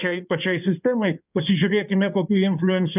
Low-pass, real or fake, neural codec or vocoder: 3.6 kHz; fake; codec, 16 kHz in and 24 kHz out, 2.2 kbps, FireRedTTS-2 codec